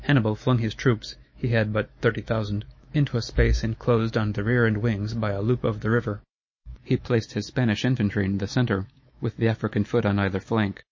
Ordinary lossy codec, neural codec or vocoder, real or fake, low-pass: MP3, 32 kbps; autoencoder, 48 kHz, 128 numbers a frame, DAC-VAE, trained on Japanese speech; fake; 7.2 kHz